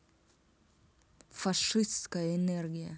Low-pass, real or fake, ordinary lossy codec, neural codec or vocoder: none; real; none; none